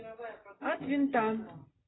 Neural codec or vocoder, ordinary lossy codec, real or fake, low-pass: none; AAC, 16 kbps; real; 7.2 kHz